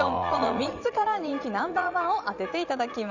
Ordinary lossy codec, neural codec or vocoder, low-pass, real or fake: none; vocoder, 44.1 kHz, 80 mel bands, Vocos; 7.2 kHz; fake